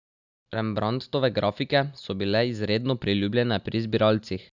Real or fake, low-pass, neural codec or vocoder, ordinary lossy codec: real; 7.2 kHz; none; none